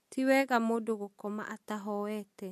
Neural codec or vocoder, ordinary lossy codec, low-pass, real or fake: none; MP3, 64 kbps; 14.4 kHz; real